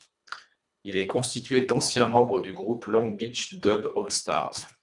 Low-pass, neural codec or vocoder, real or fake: 10.8 kHz; codec, 24 kHz, 1.5 kbps, HILCodec; fake